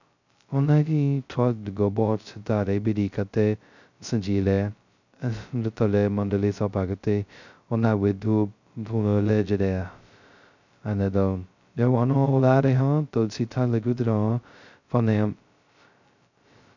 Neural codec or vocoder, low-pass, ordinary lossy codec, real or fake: codec, 16 kHz, 0.2 kbps, FocalCodec; 7.2 kHz; none; fake